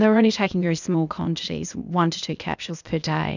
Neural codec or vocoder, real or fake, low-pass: codec, 16 kHz, 0.8 kbps, ZipCodec; fake; 7.2 kHz